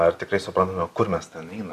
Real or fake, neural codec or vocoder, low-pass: real; none; 14.4 kHz